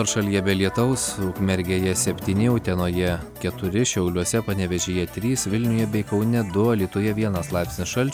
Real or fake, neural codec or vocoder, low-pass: real; none; 19.8 kHz